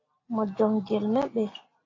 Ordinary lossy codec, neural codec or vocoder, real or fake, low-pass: AAC, 32 kbps; none; real; 7.2 kHz